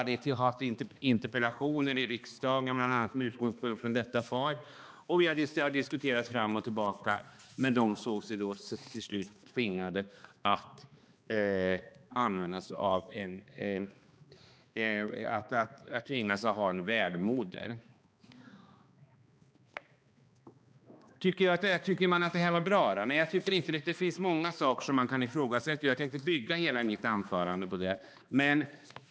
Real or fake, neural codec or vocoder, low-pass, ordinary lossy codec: fake; codec, 16 kHz, 2 kbps, X-Codec, HuBERT features, trained on balanced general audio; none; none